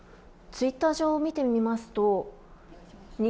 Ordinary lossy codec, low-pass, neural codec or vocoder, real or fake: none; none; none; real